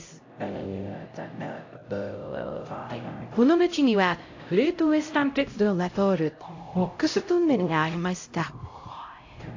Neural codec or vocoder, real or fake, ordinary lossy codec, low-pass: codec, 16 kHz, 0.5 kbps, X-Codec, HuBERT features, trained on LibriSpeech; fake; AAC, 48 kbps; 7.2 kHz